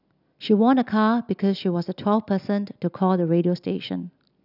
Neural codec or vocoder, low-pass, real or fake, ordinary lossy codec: none; 5.4 kHz; real; none